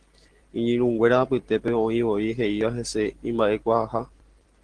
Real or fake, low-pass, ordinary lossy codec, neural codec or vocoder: real; 9.9 kHz; Opus, 16 kbps; none